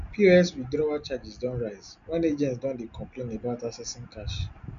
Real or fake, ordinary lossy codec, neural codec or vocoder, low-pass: real; none; none; 7.2 kHz